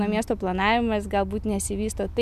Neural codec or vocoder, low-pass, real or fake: none; 14.4 kHz; real